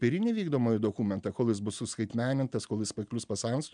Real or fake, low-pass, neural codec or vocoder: real; 9.9 kHz; none